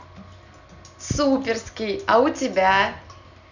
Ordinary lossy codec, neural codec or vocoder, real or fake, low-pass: none; none; real; 7.2 kHz